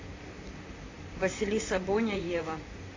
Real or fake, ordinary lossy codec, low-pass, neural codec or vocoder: fake; AAC, 32 kbps; 7.2 kHz; vocoder, 44.1 kHz, 128 mel bands, Pupu-Vocoder